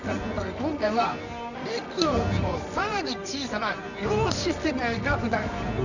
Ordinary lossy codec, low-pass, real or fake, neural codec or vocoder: none; 7.2 kHz; fake; codec, 24 kHz, 0.9 kbps, WavTokenizer, medium music audio release